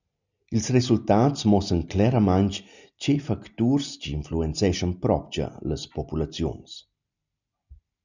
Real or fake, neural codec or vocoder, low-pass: real; none; 7.2 kHz